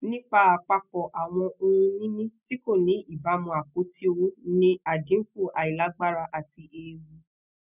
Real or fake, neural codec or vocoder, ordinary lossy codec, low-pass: real; none; none; 3.6 kHz